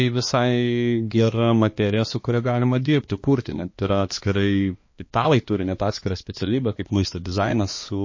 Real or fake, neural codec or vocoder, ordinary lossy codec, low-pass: fake; codec, 16 kHz, 2 kbps, X-Codec, HuBERT features, trained on balanced general audio; MP3, 32 kbps; 7.2 kHz